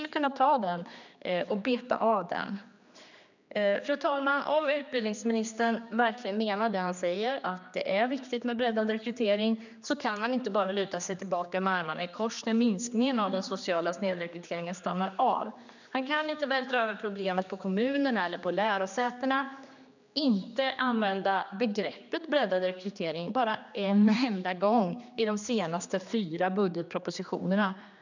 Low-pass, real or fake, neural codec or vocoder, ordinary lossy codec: 7.2 kHz; fake; codec, 16 kHz, 2 kbps, X-Codec, HuBERT features, trained on general audio; none